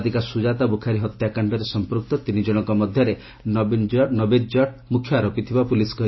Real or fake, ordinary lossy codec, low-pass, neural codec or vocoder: real; MP3, 24 kbps; 7.2 kHz; none